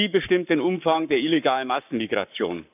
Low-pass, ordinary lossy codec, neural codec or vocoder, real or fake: 3.6 kHz; none; codec, 44.1 kHz, 7.8 kbps, Pupu-Codec; fake